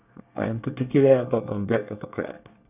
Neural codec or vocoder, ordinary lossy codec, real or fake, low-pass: codec, 24 kHz, 1 kbps, SNAC; none; fake; 3.6 kHz